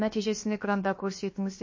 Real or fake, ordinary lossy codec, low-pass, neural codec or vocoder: fake; MP3, 32 kbps; 7.2 kHz; codec, 16 kHz, about 1 kbps, DyCAST, with the encoder's durations